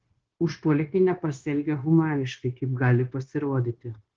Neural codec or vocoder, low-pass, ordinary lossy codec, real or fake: codec, 16 kHz, 0.9 kbps, LongCat-Audio-Codec; 7.2 kHz; Opus, 16 kbps; fake